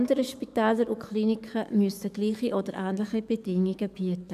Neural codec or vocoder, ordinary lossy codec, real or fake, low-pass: codec, 44.1 kHz, 7.8 kbps, DAC; none; fake; 14.4 kHz